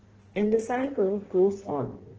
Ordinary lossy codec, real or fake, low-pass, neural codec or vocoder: Opus, 16 kbps; fake; 7.2 kHz; codec, 16 kHz in and 24 kHz out, 1.1 kbps, FireRedTTS-2 codec